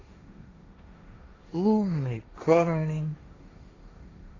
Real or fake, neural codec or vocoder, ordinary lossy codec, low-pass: fake; codec, 16 kHz, 1.1 kbps, Voila-Tokenizer; AAC, 32 kbps; 7.2 kHz